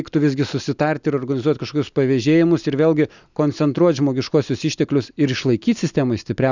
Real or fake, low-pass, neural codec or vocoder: real; 7.2 kHz; none